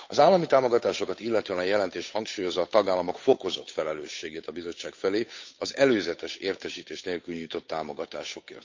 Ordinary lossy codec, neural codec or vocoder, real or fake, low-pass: MP3, 48 kbps; codec, 16 kHz, 8 kbps, FunCodec, trained on Chinese and English, 25 frames a second; fake; 7.2 kHz